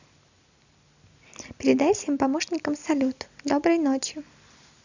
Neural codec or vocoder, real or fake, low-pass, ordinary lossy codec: none; real; 7.2 kHz; none